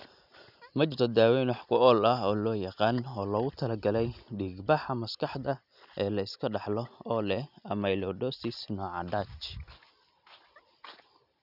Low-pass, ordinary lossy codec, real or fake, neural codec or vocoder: 5.4 kHz; none; real; none